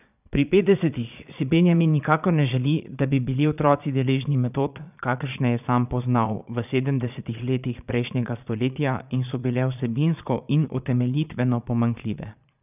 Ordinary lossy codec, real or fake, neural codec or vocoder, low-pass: none; fake; vocoder, 22.05 kHz, 80 mel bands, Vocos; 3.6 kHz